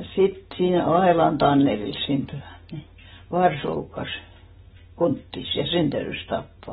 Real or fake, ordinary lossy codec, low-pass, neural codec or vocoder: real; AAC, 16 kbps; 19.8 kHz; none